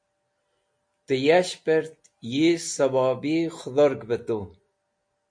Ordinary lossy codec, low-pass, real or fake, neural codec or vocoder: MP3, 48 kbps; 9.9 kHz; real; none